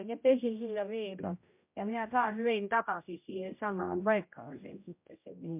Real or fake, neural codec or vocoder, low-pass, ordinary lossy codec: fake; codec, 16 kHz, 0.5 kbps, X-Codec, HuBERT features, trained on general audio; 3.6 kHz; MP3, 32 kbps